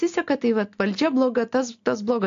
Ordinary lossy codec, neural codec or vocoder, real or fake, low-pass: AAC, 48 kbps; none; real; 7.2 kHz